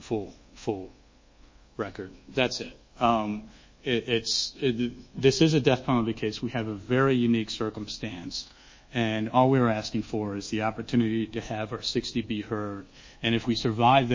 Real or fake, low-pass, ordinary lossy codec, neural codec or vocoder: fake; 7.2 kHz; MP3, 32 kbps; codec, 24 kHz, 1.2 kbps, DualCodec